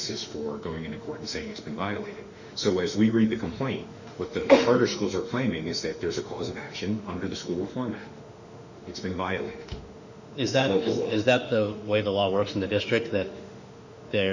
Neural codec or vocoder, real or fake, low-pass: autoencoder, 48 kHz, 32 numbers a frame, DAC-VAE, trained on Japanese speech; fake; 7.2 kHz